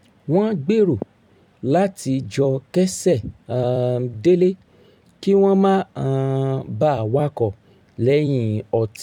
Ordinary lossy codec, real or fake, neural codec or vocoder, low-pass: none; fake; vocoder, 44.1 kHz, 128 mel bands every 256 samples, BigVGAN v2; 19.8 kHz